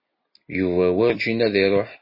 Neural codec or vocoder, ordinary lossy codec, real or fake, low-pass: none; MP3, 24 kbps; real; 5.4 kHz